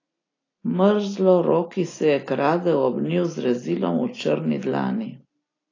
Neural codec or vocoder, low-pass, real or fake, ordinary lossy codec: none; 7.2 kHz; real; AAC, 32 kbps